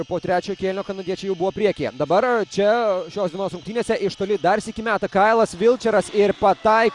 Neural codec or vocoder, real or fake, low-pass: none; real; 10.8 kHz